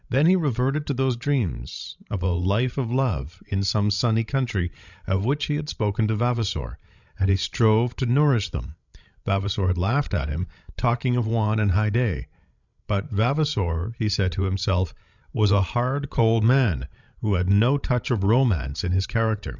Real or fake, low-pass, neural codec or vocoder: fake; 7.2 kHz; codec, 16 kHz, 16 kbps, FreqCodec, larger model